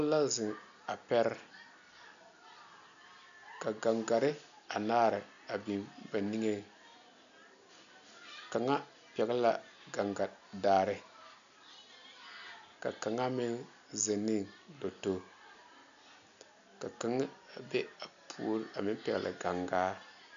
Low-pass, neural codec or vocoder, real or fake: 7.2 kHz; none; real